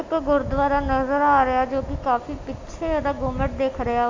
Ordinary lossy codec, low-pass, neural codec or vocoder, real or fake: AAC, 48 kbps; 7.2 kHz; none; real